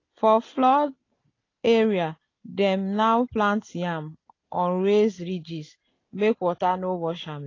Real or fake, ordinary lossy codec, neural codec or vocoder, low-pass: real; AAC, 32 kbps; none; 7.2 kHz